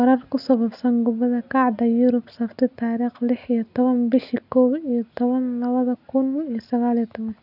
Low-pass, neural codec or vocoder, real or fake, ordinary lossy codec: 5.4 kHz; none; real; none